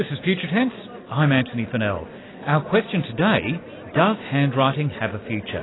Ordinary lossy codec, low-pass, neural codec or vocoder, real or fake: AAC, 16 kbps; 7.2 kHz; none; real